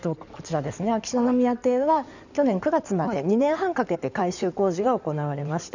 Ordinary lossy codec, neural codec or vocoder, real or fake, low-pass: none; codec, 16 kHz in and 24 kHz out, 2.2 kbps, FireRedTTS-2 codec; fake; 7.2 kHz